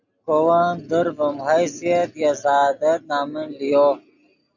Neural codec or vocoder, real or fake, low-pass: none; real; 7.2 kHz